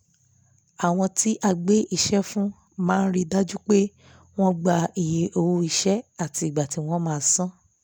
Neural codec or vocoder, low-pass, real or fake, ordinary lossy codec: none; none; real; none